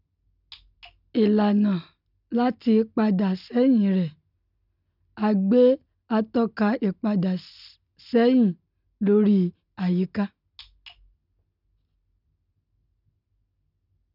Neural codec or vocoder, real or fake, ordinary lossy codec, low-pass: none; real; none; 5.4 kHz